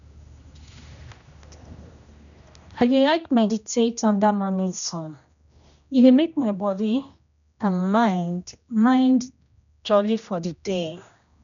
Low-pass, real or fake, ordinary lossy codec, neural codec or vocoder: 7.2 kHz; fake; none; codec, 16 kHz, 1 kbps, X-Codec, HuBERT features, trained on general audio